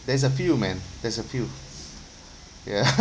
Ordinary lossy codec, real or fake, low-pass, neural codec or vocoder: none; real; none; none